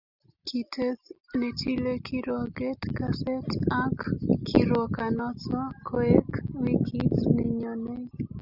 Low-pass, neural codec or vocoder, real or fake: 5.4 kHz; none; real